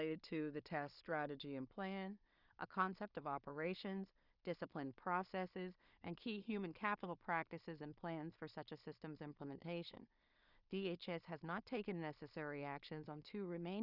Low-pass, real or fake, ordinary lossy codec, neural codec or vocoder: 5.4 kHz; fake; Opus, 64 kbps; codec, 16 kHz in and 24 kHz out, 0.4 kbps, LongCat-Audio-Codec, two codebook decoder